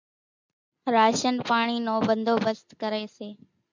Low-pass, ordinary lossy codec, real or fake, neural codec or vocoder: 7.2 kHz; MP3, 64 kbps; real; none